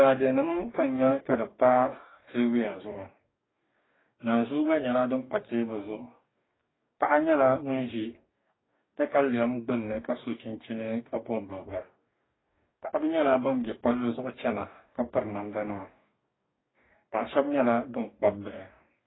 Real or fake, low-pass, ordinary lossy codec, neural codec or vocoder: fake; 7.2 kHz; AAC, 16 kbps; codec, 44.1 kHz, 2.6 kbps, DAC